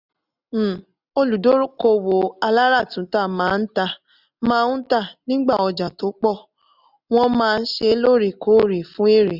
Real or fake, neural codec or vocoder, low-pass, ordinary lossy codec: real; none; 5.4 kHz; none